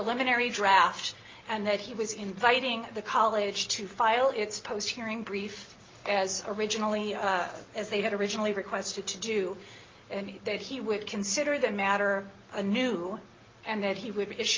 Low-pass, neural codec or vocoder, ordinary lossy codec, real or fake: 7.2 kHz; none; Opus, 32 kbps; real